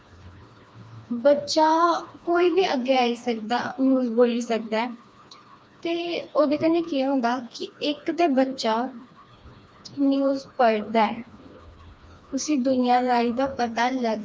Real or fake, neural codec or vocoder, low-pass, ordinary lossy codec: fake; codec, 16 kHz, 2 kbps, FreqCodec, smaller model; none; none